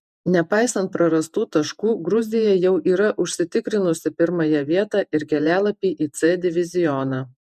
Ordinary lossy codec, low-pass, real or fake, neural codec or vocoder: MP3, 96 kbps; 14.4 kHz; fake; vocoder, 48 kHz, 128 mel bands, Vocos